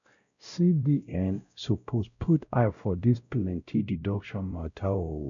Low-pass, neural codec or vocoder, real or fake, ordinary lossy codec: 7.2 kHz; codec, 16 kHz, 0.5 kbps, X-Codec, WavLM features, trained on Multilingual LibriSpeech; fake; none